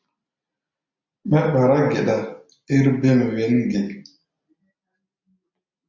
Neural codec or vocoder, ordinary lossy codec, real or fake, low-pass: none; MP3, 64 kbps; real; 7.2 kHz